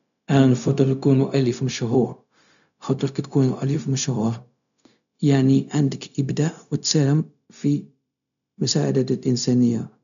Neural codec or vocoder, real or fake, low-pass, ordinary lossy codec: codec, 16 kHz, 0.4 kbps, LongCat-Audio-Codec; fake; 7.2 kHz; none